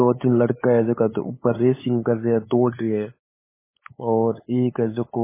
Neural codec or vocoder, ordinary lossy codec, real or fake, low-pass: codec, 16 kHz, 8 kbps, FunCodec, trained on LibriTTS, 25 frames a second; MP3, 16 kbps; fake; 3.6 kHz